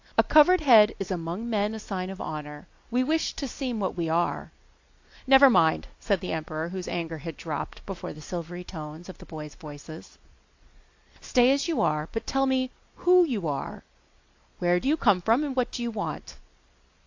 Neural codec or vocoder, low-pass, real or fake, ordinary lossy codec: none; 7.2 kHz; real; AAC, 48 kbps